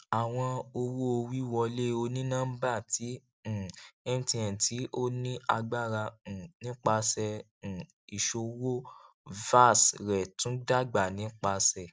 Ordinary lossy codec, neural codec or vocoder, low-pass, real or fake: none; none; none; real